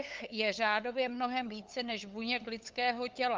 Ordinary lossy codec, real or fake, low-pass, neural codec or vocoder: Opus, 24 kbps; fake; 7.2 kHz; codec, 16 kHz, 8 kbps, FunCodec, trained on LibriTTS, 25 frames a second